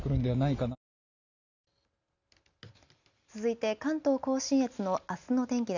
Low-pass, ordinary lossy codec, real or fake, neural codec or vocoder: 7.2 kHz; none; real; none